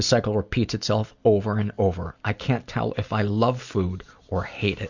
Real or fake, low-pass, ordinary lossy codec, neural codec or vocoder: real; 7.2 kHz; Opus, 64 kbps; none